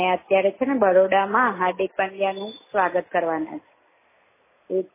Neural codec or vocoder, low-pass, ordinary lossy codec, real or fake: none; 3.6 kHz; MP3, 16 kbps; real